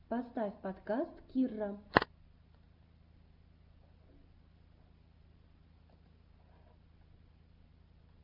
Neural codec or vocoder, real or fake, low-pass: none; real; 5.4 kHz